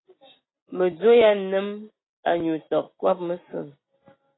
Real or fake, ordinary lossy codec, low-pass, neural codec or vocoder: real; AAC, 16 kbps; 7.2 kHz; none